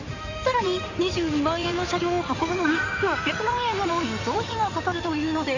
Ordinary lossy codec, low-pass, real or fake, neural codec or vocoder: none; 7.2 kHz; fake; codec, 16 kHz in and 24 kHz out, 2.2 kbps, FireRedTTS-2 codec